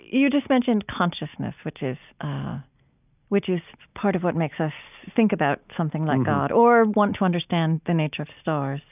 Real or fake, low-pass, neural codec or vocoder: real; 3.6 kHz; none